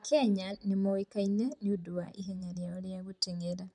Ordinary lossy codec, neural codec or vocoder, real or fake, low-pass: none; vocoder, 44.1 kHz, 128 mel bands, Pupu-Vocoder; fake; 10.8 kHz